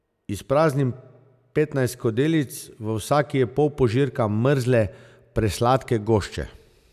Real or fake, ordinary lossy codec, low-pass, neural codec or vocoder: real; none; 14.4 kHz; none